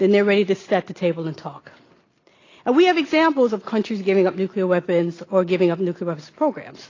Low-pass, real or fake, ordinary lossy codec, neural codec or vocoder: 7.2 kHz; real; AAC, 32 kbps; none